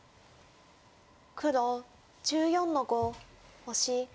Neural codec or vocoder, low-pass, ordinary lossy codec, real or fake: none; none; none; real